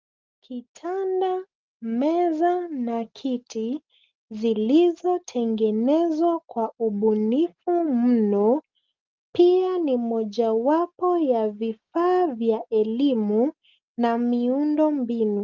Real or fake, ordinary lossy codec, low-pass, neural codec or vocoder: real; Opus, 32 kbps; 7.2 kHz; none